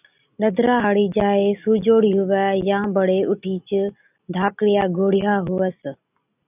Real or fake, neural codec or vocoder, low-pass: real; none; 3.6 kHz